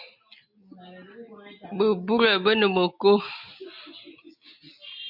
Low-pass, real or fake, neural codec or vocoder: 5.4 kHz; real; none